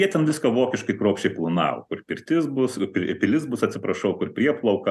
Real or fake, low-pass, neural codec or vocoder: real; 14.4 kHz; none